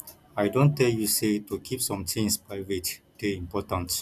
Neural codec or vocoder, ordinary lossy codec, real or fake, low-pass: none; none; real; 14.4 kHz